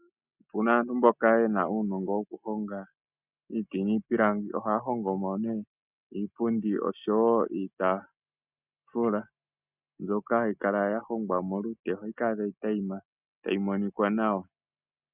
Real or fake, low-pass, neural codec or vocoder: real; 3.6 kHz; none